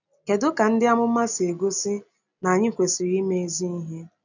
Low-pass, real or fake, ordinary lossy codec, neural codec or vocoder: 7.2 kHz; real; none; none